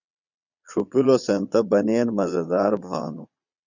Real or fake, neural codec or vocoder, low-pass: fake; vocoder, 22.05 kHz, 80 mel bands, Vocos; 7.2 kHz